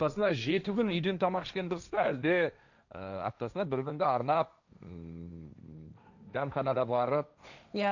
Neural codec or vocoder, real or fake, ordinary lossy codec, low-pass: codec, 16 kHz, 1.1 kbps, Voila-Tokenizer; fake; none; 7.2 kHz